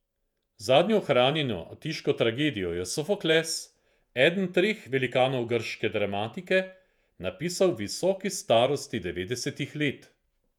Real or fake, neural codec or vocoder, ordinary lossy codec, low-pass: fake; vocoder, 48 kHz, 128 mel bands, Vocos; none; 19.8 kHz